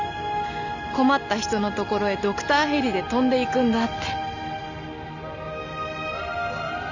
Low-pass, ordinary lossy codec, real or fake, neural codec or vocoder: 7.2 kHz; none; real; none